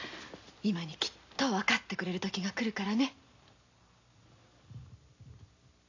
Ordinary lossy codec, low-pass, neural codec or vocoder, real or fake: AAC, 48 kbps; 7.2 kHz; none; real